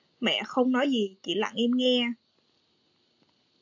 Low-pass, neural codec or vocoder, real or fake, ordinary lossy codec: 7.2 kHz; none; real; AAC, 48 kbps